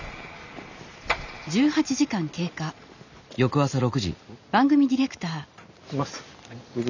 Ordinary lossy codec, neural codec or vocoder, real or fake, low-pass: none; none; real; 7.2 kHz